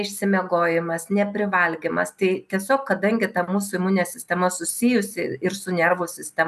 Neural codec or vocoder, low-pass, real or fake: none; 14.4 kHz; real